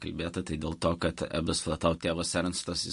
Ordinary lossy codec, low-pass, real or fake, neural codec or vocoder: MP3, 48 kbps; 10.8 kHz; real; none